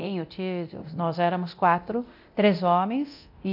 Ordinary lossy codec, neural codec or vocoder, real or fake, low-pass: MP3, 48 kbps; codec, 24 kHz, 0.9 kbps, DualCodec; fake; 5.4 kHz